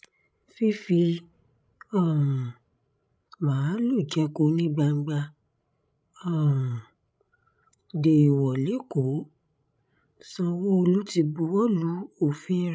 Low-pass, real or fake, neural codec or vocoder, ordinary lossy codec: none; fake; codec, 16 kHz, 16 kbps, FreqCodec, larger model; none